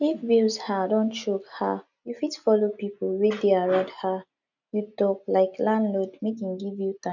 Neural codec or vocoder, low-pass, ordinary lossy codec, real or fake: none; 7.2 kHz; none; real